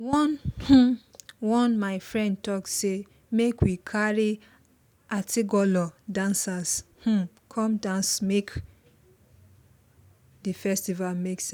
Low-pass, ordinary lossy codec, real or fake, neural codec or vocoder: none; none; real; none